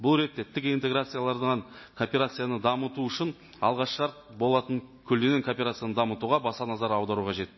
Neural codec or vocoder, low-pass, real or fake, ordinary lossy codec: none; 7.2 kHz; real; MP3, 24 kbps